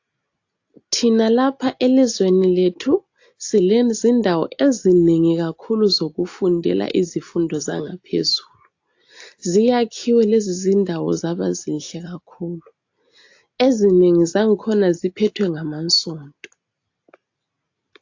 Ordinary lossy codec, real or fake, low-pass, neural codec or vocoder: AAC, 48 kbps; real; 7.2 kHz; none